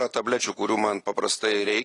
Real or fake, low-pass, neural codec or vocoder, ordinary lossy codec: real; 10.8 kHz; none; AAC, 32 kbps